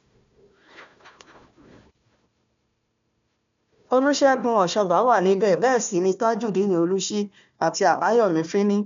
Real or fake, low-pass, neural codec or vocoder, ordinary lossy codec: fake; 7.2 kHz; codec, 16 kHz, 1 kbps, FunCodec, trained on Chinese and English, 50 frames a second; MP3, 64 kbps